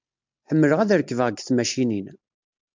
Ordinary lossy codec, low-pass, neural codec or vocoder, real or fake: MP3, 64 kbps; 7.2 kHz; none; real